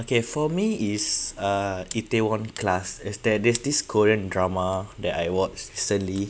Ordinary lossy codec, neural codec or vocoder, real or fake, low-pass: none; none; real; none